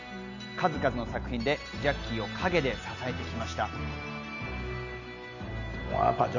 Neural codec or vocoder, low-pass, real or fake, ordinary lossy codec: none; 7.2 kHz; real; none